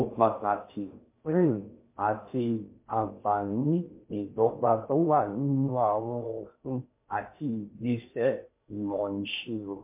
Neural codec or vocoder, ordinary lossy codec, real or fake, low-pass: codec, 16 kHz in and 24 kHz out, 0.6 kbps, FocalCodec, streaming, 4096 codes; MP3, 24 kbps; fake; 3.6 kHz